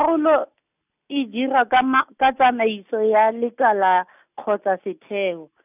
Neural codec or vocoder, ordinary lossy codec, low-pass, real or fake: none; none; 3.6 kHz; real